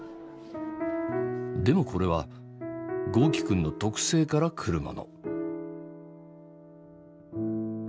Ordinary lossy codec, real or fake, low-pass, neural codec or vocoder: none; real; none; none